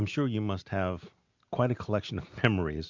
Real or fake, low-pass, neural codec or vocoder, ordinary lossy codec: real; 7.2 kHz; none; MP3, 64 kbps